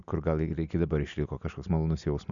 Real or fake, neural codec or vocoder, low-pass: real; none; 7.2 kHz